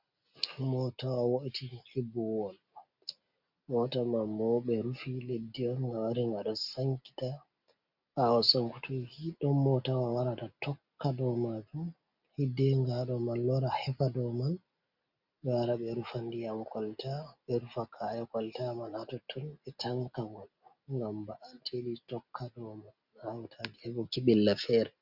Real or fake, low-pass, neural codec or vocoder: real; 5.4 kHz; none